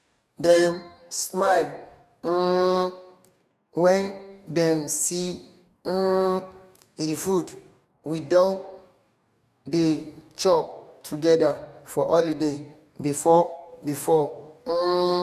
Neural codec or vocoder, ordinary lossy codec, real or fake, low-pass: codec, 44.1 kHz, 2.6 kbps, DAC; none; fake; 14.4 kHz